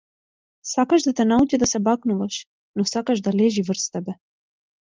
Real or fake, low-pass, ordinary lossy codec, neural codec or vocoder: real; 7.2 kHz; Opus, 24 kbps; none